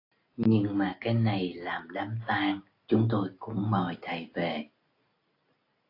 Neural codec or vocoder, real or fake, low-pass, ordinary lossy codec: none; real; 5.4 kHz; AAC, 32 kbps